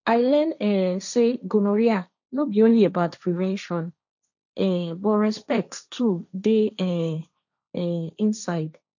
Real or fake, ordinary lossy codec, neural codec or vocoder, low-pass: fake; none; codec, 16 kHz, 1.1 kbps, Voila-Tokenizer; 7.2 kHz